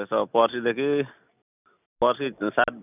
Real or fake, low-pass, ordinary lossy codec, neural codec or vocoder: real; 3.6 kHz; none; none